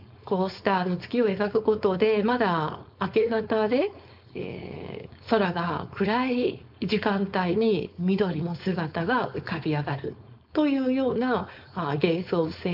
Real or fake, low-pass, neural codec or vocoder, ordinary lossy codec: fake; 5.4 kHz; codec, 16 kHz, 4.8 kbps, FACodec; MP3, 48 kbps